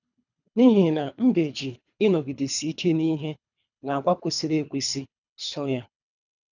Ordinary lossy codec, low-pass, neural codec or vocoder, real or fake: none; 7.2 kHz; codec, 24 kHz, 3 kbps, HILCodec; fake